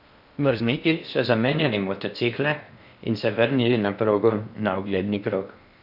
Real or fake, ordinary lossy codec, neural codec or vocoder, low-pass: fake; none; codec, 16 kHz in and 24 kHz out, 0.6 kbps, FocalCodec, streaming, 4096 codes; 5.4 kHz